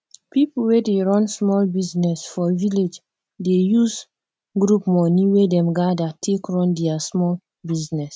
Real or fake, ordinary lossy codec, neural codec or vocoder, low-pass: real; none; none; none